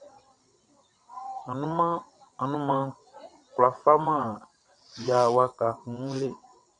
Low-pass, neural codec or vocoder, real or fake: 9.9 kHz; vocoder, 22.05 kHz, 80 mel bands, WaveNeXt; fake